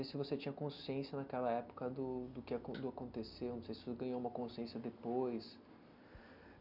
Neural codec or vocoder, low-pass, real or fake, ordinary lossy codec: none; 5.4 kHz; real; none